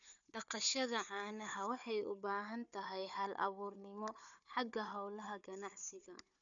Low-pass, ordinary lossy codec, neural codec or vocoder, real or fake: 7.2 kHz; none; codec, 16 kHz, 4 kbps, FunCodec, trained on Chinese and English, 50 frames a second; fake